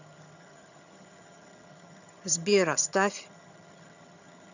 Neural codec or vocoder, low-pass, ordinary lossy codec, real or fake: vocoder, 22.05 kHz, 80 mel bands, HiFi-GAN; 7.2 kHz; none; fake